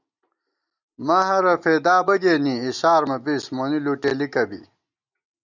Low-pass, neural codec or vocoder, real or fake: 7.2 kHz; none; real